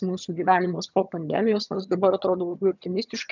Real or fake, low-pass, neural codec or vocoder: fake; 7.2 kHz; vocoder, 22.05 kHz, 80 mel bands, HiFi-GAN